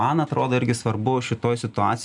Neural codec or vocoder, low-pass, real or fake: none; 10.8 kHz; real